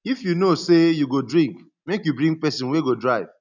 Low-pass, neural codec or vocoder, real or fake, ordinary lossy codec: 7.2 kHz; none; real; none